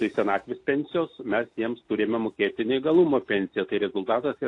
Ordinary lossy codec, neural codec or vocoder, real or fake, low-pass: AAC, 48 kbps; none; real; 10.8 kHz